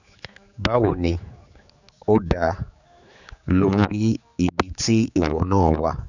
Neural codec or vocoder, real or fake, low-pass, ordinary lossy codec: codec, 16 kHz, 4 kbps, X-Codec, HuBERT features, trained on general audio; fake; 7.2 kHz; none